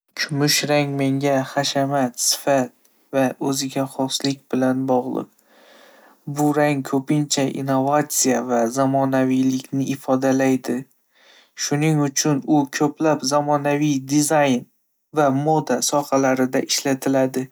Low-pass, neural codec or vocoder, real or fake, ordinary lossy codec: none; none; real; none